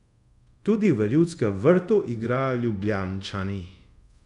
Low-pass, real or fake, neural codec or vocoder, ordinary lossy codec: 10.8 kHz; fake; codec, 24 kHz, 0.5 kbps, DualCodec; none